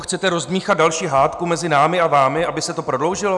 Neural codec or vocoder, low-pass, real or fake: none; 10.8 kHz; real